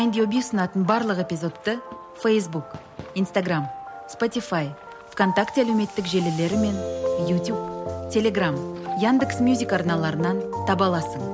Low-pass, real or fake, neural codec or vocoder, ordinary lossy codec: none; real; none; none